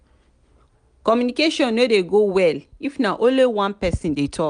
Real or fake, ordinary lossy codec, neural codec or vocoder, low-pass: real; none; none; 9.9 kHz